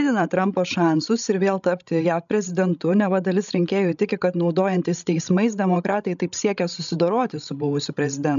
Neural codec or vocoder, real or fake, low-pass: codec, 16 kHz, 16 kbps, FreqCodec, larger model; fake; 7.2 kHz